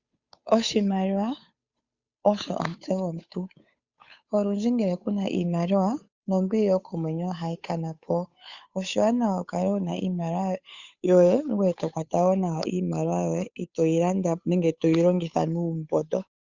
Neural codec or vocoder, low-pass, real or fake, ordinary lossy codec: codec, 16 kHz, 8 kbps, FunCodec, trained on Chinese and English, 25 frames a second; 7.2 kHz; fake; Opus, 64 kbps